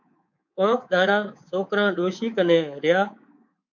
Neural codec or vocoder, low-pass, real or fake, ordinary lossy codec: codec, 24 kHz, 3.1 kbps, DualCodec; 7.2 kHz; fake; MP3, 48 kbps